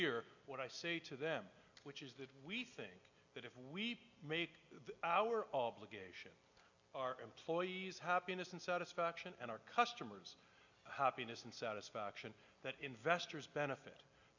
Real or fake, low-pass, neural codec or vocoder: real; 7.2 kHz; none